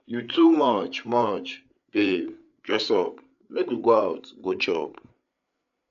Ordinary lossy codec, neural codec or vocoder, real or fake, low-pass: none; codec, 16 kHz, 8 kbps, FreqCodec, larger model; fake; 7.2 kHz